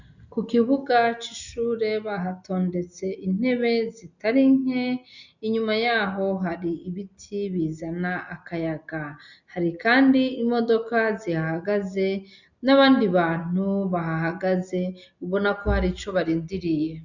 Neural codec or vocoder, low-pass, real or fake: none; 7.2 kHz; real